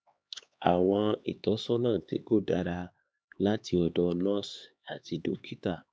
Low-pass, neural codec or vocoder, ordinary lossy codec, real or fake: none; codec, 16 kHz, 2 kbps, X-Codec, HuBERT features, trained on LibriSpeech; none; fake